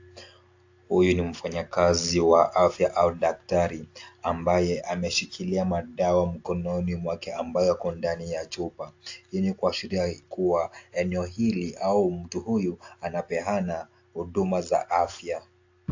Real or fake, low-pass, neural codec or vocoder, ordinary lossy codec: real; 7.2 kHz; none; AAC, 48 kbps